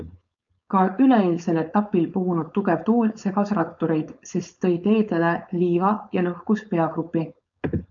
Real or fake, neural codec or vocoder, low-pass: fake; codec, 16 kHz, 4.8 kbps, FACodec; 7.2 kHz